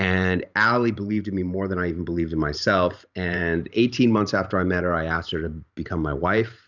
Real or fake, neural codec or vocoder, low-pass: fake; vocoder, 44.1 kHz, 128 mel bands every 512 samples, BigVGAN v2; 7.2 kHz